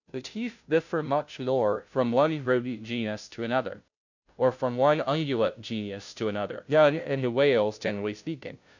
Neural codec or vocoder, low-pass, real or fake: codec, 16 kHz, 0.5 kbps, FunCodec, trained on Chinese and English, 25 frames a second; 7.2 kHz; fake